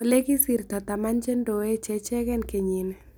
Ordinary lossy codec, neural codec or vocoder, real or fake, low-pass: none; none; real; none